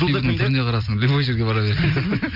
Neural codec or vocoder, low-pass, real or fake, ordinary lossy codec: none; 5.4 kHz; real; none